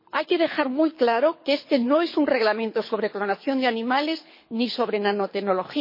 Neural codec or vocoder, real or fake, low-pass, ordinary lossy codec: codec, 24 kHz, 6 kbps, HILCodec; fake; 5.4 kHz; MP3, 24 kbps